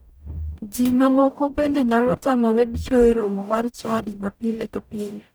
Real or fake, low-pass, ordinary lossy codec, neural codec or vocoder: fake; none; none; codec, 44.1 kHz, 0.9 kbps, DAC